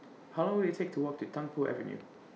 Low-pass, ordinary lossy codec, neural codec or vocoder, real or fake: none; none; none; real